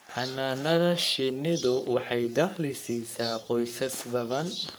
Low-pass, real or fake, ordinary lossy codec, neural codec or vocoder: none; fake; none; codec, 44.1 kHz, 3.4 kbps, Pupu-Codec